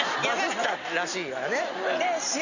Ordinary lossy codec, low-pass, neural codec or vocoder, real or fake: none; 7.2 kHz; none; real